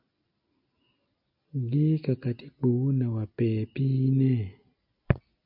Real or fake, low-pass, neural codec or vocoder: real; 5.4 kHz; none